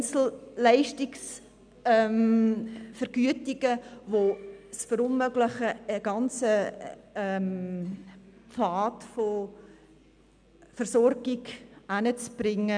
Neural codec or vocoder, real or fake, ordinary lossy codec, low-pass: none; real; none; 9.9 kHz